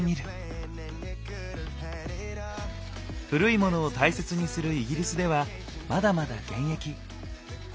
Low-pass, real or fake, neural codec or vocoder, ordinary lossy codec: none; real; none; none